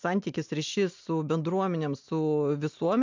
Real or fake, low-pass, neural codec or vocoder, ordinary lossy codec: real; 7.2 kHz; none; MP3, 64 kbps